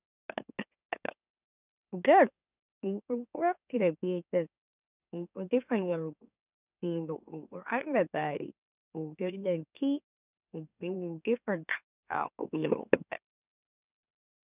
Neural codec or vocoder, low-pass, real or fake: autoencoder, 44.1 kHz, a latent of 192 numbers a frame, MeloTTS; 3.6 kHz; fake